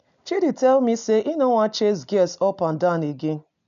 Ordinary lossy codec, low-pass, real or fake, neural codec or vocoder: none; 7.2 kHz; real; none